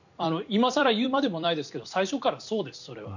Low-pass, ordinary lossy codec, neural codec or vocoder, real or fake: 7.2 kHz; none; vocoder, 44.1 kHz, 128 mel bands every 256 samples, BigVGAN v2; fake